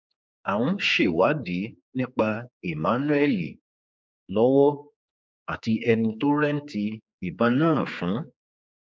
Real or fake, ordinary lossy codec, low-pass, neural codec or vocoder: fake; none; none; codec, 16 kHz, 4 kbps, X-Codec, HuBERT features, trained on general audio